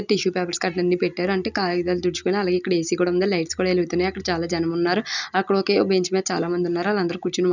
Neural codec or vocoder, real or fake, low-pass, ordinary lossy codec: none; real; 7.2 kHz; none